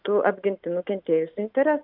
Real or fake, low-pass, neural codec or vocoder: real; 5.4 kHz; none